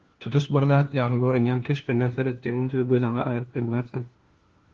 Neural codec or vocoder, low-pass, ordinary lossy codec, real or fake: codec, 16 kHz, 1 kbps, FunCodec, trained on LibriTTS, 50 frames a second; 7.2 kHz; Opus, 16 kbps; fake